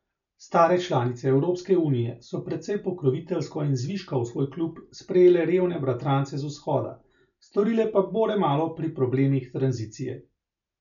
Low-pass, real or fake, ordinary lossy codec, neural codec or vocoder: 7.2 kHz; real; none; none